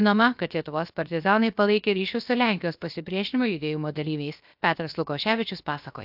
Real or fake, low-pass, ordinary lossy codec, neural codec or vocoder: fake; 5.4 kHz; AAC, 48 kbps; codec, 16 kHz, about 1 kbps, DyCAST, with the encoder's durations